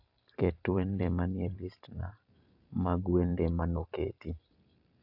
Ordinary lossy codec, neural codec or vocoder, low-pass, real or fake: AAC, 48 kbps; vocoder, 44.1 kHz, 128 mel bands every 512 samples, BigVGAN v2; 5.4 kHz; fake